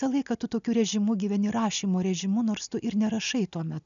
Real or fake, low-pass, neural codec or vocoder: real; 7.2 kHz; none